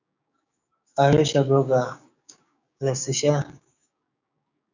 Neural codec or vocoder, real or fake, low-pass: codec, 16 kHz, 6 kbps, DAC; fake; 7.2 kHz